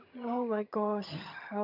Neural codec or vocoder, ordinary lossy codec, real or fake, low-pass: vocoder, 22.05 kHz, 80 mel bands, HiFi-GAN; none; fake; 5.4 kHz